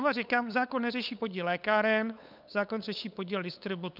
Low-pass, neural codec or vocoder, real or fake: 5.4 kHz; codec, 16 kHz, 8 kbps, FunCodec, trained on LibriTTS, 25 frames a second; fake